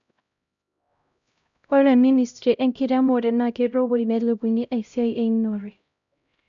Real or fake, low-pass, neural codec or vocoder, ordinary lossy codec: fake; 7.2 kHz; codec, 16 kHz, 0.5 kbps, X-Codec, HuBERT features, trained on LibriSpeech; none